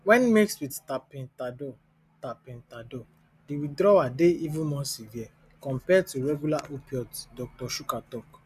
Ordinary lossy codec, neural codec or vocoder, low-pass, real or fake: none; none; 14.4 kHz; real